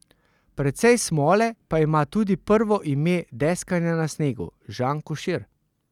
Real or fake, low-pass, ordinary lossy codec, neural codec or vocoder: real; 19.8 kHz; none; none